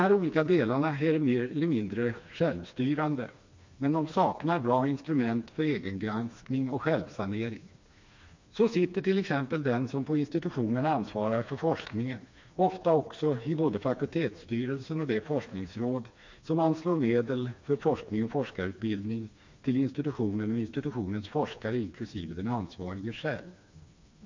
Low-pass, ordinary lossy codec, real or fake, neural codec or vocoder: 7.2 kHz; MP3, 48 kbps; fake; codec, 16 kHz, 2 kbps, FreqCodec, smaller model